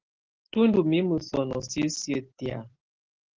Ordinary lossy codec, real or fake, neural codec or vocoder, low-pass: Opus, 16 kbps; real; none; 7.2 kHz